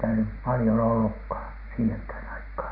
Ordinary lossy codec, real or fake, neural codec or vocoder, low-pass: none; real; none; 5.4 kHz